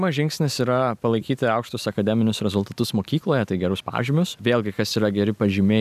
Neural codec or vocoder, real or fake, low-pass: none; real; 14.4 kHz